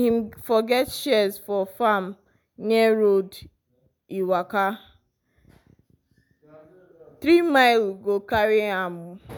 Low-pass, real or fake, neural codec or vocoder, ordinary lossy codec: none; real; none; none